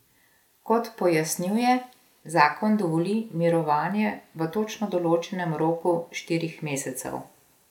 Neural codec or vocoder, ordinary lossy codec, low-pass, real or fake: none; none; 19.8 kHz; real